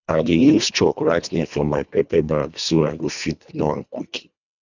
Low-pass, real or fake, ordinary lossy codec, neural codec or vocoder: 7.2 kHz; fake; none; codec, 24 kHz, 1.5 kbps, HILCodec